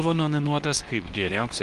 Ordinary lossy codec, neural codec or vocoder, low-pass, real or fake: Opus, 24 kbps; codec, 24 kHz, 0.9 kbps, WavTokenizer, medium speech release version 2; 10.8 kHz; fake